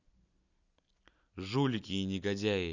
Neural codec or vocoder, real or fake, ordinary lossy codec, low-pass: none; real; none; 7.2 kHz